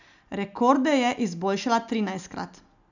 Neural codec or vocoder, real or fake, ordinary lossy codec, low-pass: none; real; none; 7.2 kHz